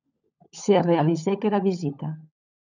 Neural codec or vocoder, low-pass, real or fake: codec, 16 kHz, 16 kbps, FunCodec, trained on LibriTTS, 50 frames a second; 7.2 kHz; fake